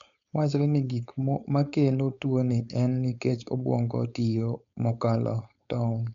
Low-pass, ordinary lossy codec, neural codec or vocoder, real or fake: 7.2 kHz; MP3, 64 kbps; codec, 16 kHz, 4.8 kbps, FACodec; fake